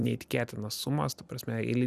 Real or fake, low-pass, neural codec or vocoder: fake; 14.4 kHz; vocoder, 44.1 kHz, 128 mel bands every 256 samples, BigVGAN v2